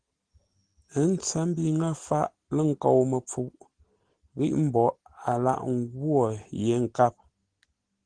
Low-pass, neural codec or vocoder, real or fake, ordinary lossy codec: 9.9 kHz; none; real; Opus, 16 kbps